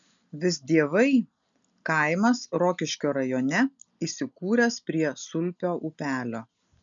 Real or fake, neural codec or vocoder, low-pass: real; none; 7.2 kHz